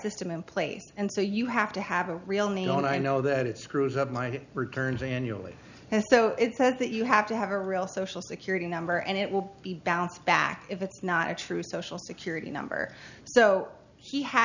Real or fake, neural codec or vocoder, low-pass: real; none; 7.2 kHz